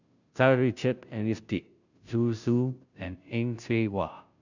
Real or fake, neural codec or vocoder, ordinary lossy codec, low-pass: fake; codec, 16 kHz, 0.5 kbps, FunCodec, trained on Chinese and English, 25 frames a second; none; 7.2 kHz